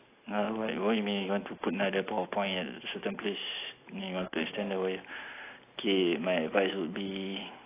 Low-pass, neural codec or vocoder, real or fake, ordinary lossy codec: 3.6 kHz; none; real; AAC, 24 kbps